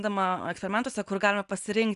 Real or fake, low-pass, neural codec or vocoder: real; 10.8 kHz; none